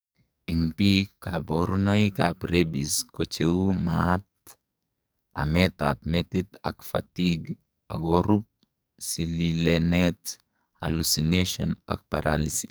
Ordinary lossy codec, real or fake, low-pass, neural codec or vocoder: none; fake; none; codec, 44.1 kHz, 2.6 kbps, SNAC